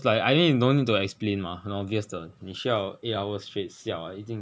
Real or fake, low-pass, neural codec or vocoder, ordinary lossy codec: real; none; none; none